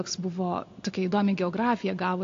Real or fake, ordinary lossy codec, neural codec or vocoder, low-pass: real; AAC, 48 kbps; none; 7.2 kHz